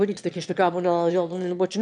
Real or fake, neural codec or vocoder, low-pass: fake; autoencoder, 22.05 kHz, a latent of 192 numbers a frame, VITS, trained on one speaker; 9.9 kHz